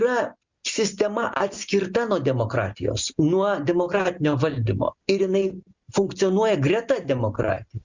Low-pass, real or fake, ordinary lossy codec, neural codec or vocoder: 7.2 kHz; real; Opus, 64 kbps; none